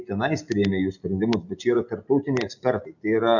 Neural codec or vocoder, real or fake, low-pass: none; real; 7.2 kHz